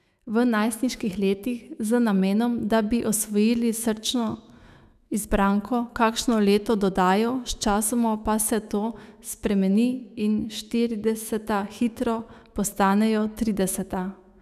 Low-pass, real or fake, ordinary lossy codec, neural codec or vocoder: 14.4 kHz; fake; none; autoencoder, 48 kHz, 128 numbers a frame, DAC-VAE, trained on Japanese speech